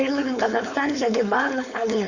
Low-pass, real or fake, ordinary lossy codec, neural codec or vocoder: 7.2 kHz; fake; Opus, 64 kbps; codec, 16 kHz, 4.8 kbps, FACodec